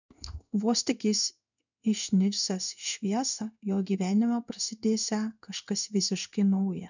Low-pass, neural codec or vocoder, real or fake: 7.2 kHz; codec, 16 kHz in and 24 kHz out, 1 kbps, XY-Tokenizer; fake